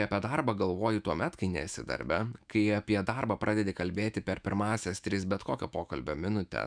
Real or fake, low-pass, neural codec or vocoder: fake; 9.9 kHz; autoencoder, 48 kHz, 128 numbers a frame, DAC-VAE, trained on Japanese speech